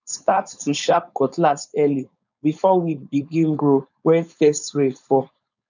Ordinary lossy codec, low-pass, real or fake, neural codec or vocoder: none; 7.2 kHz; fake; codec, 16 kHz, 4.8 kbps, FACodec